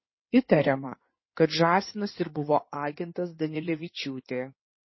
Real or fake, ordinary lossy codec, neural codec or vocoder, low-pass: fake; MP3, 24 kbps; codec, 16 kHz in and 24 kHz out, 2.2 kbps, FireRedTTS-2 codec; 7.2 kHz